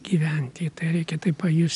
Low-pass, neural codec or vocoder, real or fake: 10.8 kHz; none; real